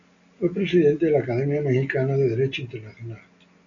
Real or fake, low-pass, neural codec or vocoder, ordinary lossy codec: real; 7.2 kHz; none; MP3, 96 kbps